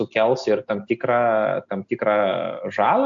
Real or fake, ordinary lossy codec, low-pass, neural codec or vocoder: real; AAC, 64 kbps; 7.2 kHz; none